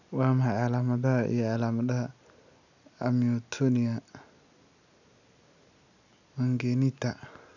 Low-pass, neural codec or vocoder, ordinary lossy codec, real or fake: 7.2 kHz; none; none; real